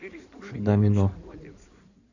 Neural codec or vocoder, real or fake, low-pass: codec, 16 kHz in and 24 kHz out, 2.2 kbps, FireRedTTS-2 codec; fake; 7.2 kHz